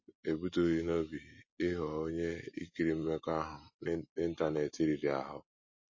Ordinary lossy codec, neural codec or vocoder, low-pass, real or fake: MP3, 32 kbps; none; 7.2 kHz; real